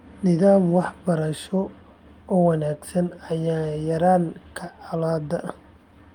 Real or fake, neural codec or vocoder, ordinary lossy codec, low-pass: real; none; Opus, 32 kbps; 19.8 kHz